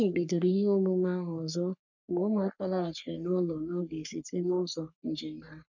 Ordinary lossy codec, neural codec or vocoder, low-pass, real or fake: none; codec, 44.1 kHz, 3.4 kbps, Pupu-Codec; 7.2 kHz; fake